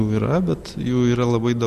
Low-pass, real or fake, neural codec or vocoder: 14.4 kHz; real; none